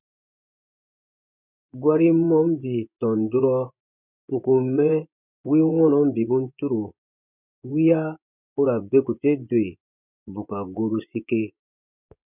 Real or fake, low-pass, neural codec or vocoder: fake; 3.6 kHz; vocoder, 24 kHz, 100 mel bands, Vocos